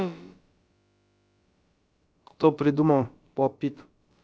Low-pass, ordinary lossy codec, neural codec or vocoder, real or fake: none; none; codec, 16 kHz, about 1 kbps, DyCAST, with the encoder's durations; fake